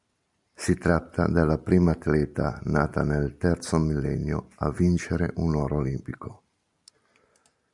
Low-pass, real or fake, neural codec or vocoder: 10.8 kHz; real; none